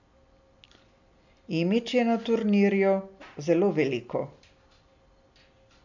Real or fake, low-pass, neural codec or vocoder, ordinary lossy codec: real; 7.2 kHz; none; none